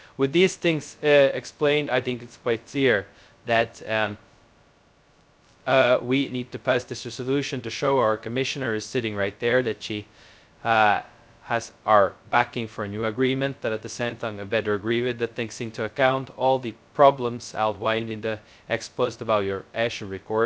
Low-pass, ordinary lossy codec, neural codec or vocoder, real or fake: none; none; codec, 16 kHz, 0.2 kbps, FocalCodec; fake